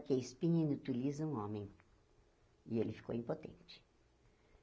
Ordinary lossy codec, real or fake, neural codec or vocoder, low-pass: none; real; none; none